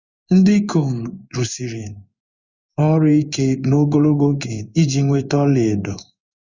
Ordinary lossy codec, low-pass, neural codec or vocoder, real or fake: Opus, 64 kbps; 7.2 kHz; codec, 16 kHz in and 24 kHz out, 1 kbps, XY-Tokenizer; fake